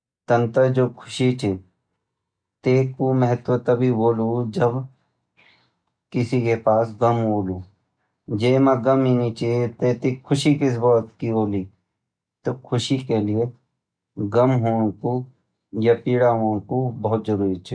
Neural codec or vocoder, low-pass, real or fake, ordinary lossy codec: none; 9.9 kHz; real; none